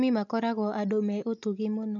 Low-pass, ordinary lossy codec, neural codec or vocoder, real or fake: 7.2 kHz; MP3, 64 kbps; none; real